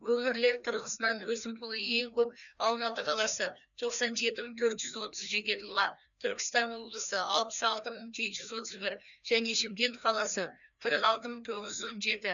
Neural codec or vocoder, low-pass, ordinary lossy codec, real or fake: codec, 16 kHz, 1 kbps, FreqCodec, larger model; 7.2 kHz; none; fake